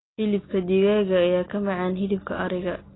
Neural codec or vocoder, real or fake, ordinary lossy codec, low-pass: none; real; AAC, 16 kbps; 7.2 kHz